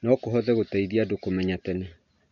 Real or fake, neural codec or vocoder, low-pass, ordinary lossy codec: real; none; 7.2 kHz; none